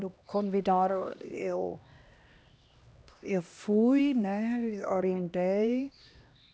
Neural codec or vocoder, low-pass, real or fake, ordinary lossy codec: codec, 16 kHz, 1 kbps, X-Codec, HuBERT features, trained on LibriSpeech; none; fake; none